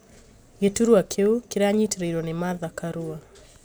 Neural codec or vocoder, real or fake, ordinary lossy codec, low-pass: none; real; none; none